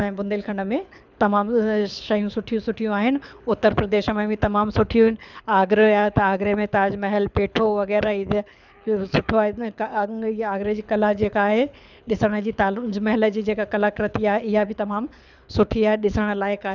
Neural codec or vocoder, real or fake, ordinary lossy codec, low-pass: codec, 24 kHz, 6 kbps, HILCodec; fake; none; 7.2 kHz